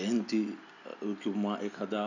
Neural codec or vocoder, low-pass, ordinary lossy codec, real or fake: none; 7.2 kHz; none; real